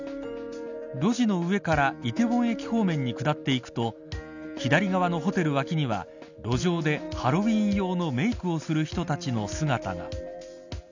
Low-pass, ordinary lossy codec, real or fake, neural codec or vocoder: 7.2 kHz; none; real; none